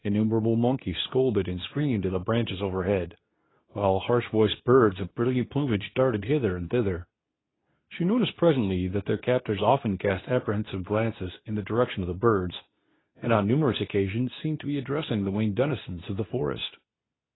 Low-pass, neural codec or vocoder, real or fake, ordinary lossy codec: 7.2 kHz; codec, 24 kHz, 0.9 kbps, WavTokenizer, medium speech release version 2; fake; AAC, 16 kbps